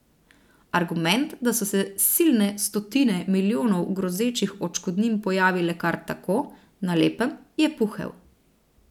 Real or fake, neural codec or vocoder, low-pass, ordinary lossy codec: real; none; 19.8 kHz; none